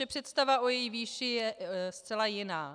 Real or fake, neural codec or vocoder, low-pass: real; none; 9.9 kHz